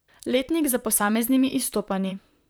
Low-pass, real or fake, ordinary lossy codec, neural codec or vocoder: none; fake; none; vocoder, 44.1 kHz, 128 mel bands, Pupu-Vocoder